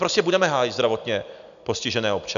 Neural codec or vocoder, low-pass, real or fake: none; 7.2 kHz; real